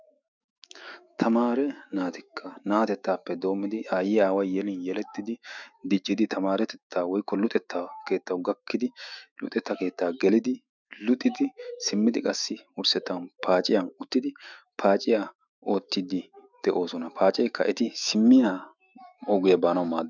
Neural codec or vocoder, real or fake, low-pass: autoencoder, 48 kHz, 128 numbers a frame, DAC-VAE, trained on Japanese speech; fake; 7.2 kHz